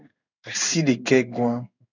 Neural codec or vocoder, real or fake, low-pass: codec, 16 kHz in and 24 kHz out, 1 kbps, XY-Tokenizer; fake; 7.2 kHz